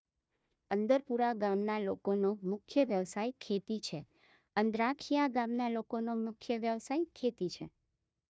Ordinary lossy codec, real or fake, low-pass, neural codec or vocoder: none; fake; none; codec, 16 kHz, 1 kbps, FunCodec, trained on Chinese and English, 50 frames a second